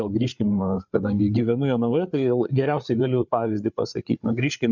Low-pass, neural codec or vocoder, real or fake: 7.2 kHz; codec, 16 kHz, 8 kbps, FreqCodec, larger model; fake